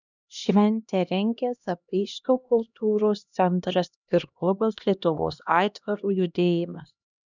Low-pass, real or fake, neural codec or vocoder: 7.2 kHz; fake; codec, 16 kHz, 1 kbps, X-Codec, HuBERT features, trained on LibriSpeech